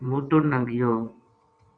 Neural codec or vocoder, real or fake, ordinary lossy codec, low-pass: vocoder, 22.05 kHz, 80 mel bands, WaveNeXt; fake; MP3, 64 kbps; 9.9 kHz